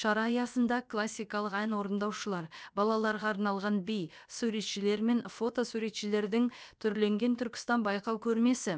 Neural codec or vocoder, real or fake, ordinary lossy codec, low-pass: codec, 16 kHz, about 1 kbps, DyCAST, with the encoder's durations; fake; none; none